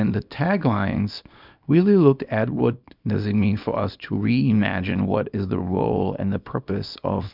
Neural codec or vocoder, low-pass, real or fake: codec, 24 kHz, 0.9 kbps, WavTokenizer, small release; 5.4 kHz; fake